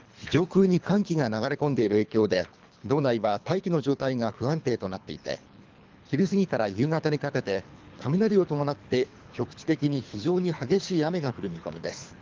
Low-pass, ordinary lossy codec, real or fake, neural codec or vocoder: 7.2 kHz; Opus, 32 kbps; fake; codec, 24 kHz, 3 kbps, HILCodec